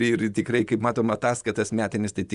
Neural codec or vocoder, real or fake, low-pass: vocoder, 24 kHz, 100 mel bands, Vocos; fake; 10.8 kHz